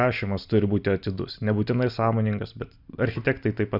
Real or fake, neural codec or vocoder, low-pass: real; none; 5.4 kHz